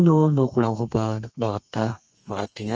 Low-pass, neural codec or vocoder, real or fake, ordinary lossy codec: 7.2 kHz; codec, 32 kHz, 1.9 kbps, SNAC; fake; Opus, 24 kbps